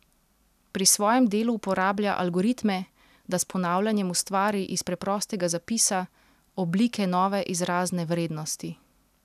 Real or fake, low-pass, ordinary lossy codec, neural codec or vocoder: real; 14.4 kHz; none; none